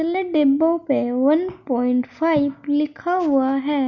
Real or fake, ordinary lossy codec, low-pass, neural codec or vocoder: real; none; 7.2 kHz; none